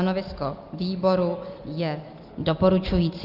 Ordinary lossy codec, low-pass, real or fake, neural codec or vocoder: Opus, 24 kbps; 5.4 kHz; real; none